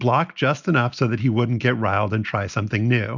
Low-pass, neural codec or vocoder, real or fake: 7.2 kHz; none; real